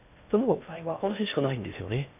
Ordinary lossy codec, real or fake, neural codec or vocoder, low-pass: none; fake; codec, 16 kHz in and 24 kHz out, 0.8 kbps, FocalCodec, streaming, 65536 codes; 3.6 kHz